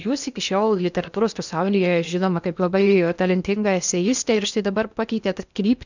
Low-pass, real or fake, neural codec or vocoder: 7.2 kHz; fake; codec, 16 kHz in and 24 kHz out, 0.8 kbps, FocalCodec, streaming, 65536 codes